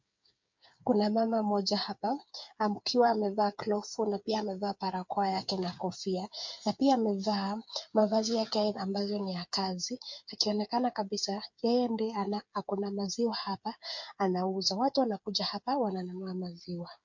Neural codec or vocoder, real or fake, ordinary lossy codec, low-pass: codec, 16 kHz, 8 kbps, FreqCodec, smaller model; fake; MP3, 48 kbps; 7.2 kHz